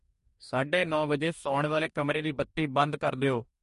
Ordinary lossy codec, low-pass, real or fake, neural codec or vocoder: MP3, 48 kbps; 14.4 kHz; fake; codec, 44.1 kHz, 2.6 kbps, DAC